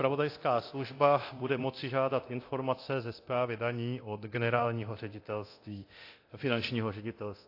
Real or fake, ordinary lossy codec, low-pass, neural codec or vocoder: fake; AAC, 32 kbps; 5.4 kHz; codec, 24 kHz, 0.9 kbps, DualCodec